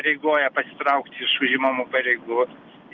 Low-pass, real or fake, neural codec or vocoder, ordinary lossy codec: 7.2 kHz; real; none; Opus, 24 kbps